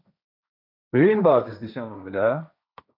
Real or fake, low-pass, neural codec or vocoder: fake; 5.4 kHz; codec, 16 kHz, 1.1 kbps, Voila-Tokenizer